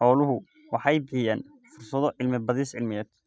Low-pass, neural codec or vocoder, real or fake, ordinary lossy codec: none; none; real; none